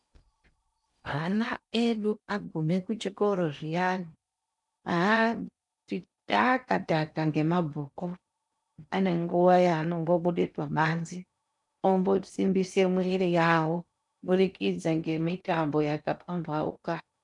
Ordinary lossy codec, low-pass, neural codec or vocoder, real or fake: MP3, 96 kbps; 10.8 kHz; codec, 16 kHz in and 24 kHz out, 0.8 kbps, FocalCodec, streaming, 65536 codes; fake